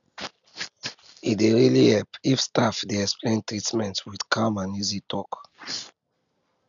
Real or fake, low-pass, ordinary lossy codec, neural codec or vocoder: real; 7.2 kHz; none; none